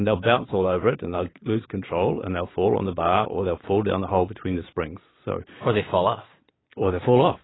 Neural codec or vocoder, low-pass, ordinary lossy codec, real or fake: vocoder, 22.05 kHz, 80 mel bands, Vocos; 7.2 kHz; AAC, 16 kbps; fake